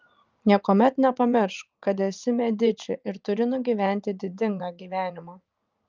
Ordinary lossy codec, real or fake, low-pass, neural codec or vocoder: Opus, 24 kbps; fake; 7.2 kHz; vocoder, 44.1 kHz, 80 mel bands, Vocos